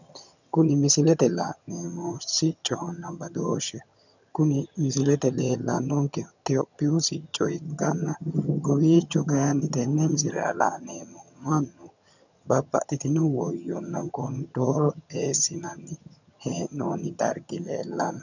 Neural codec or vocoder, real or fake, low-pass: vocoder, 22.05 kHz, 80 mel bands, HiFi-GAN; fake; 7.2 kHz